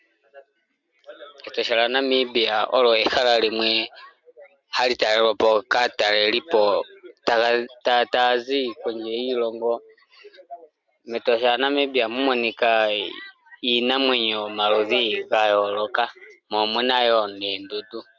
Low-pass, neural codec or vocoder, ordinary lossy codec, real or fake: 7.2 kHz; none; MP3, 64 kbps; real